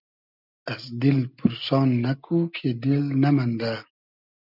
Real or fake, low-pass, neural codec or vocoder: real; 5.4 kHz; none